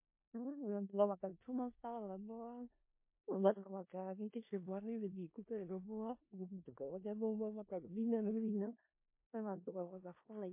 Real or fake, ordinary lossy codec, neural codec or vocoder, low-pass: fake; MP3, 24 kbps; codec, 16 kHz in and 24 kHz out, 0.4 kbps, LongCat-Audio-Codec, four codebook decoder; 3.6 kHz